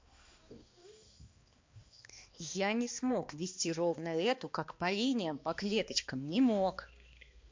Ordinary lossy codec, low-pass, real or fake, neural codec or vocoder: MP3, 48 kbps; 7.2 kHz; fake; codec, 16 kHz, 2 kbps, X-Codec, HuBERT features, trained on balanced general audio